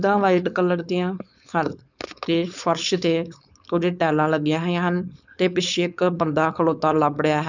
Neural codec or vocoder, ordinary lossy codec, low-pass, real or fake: codec, 16 kHz, 4.8 kbps, FACodec; none; 7.2 kHz; fake